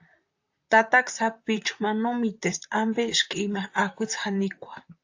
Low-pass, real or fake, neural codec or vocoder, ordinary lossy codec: 7.2 kHz; fake; vocoder, 44.1 kHz, 128 mel bands, Pupu-Vocoder; AAC, 48 kbps